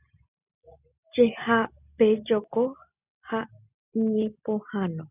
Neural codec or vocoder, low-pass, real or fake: none; 3.6 kHz; real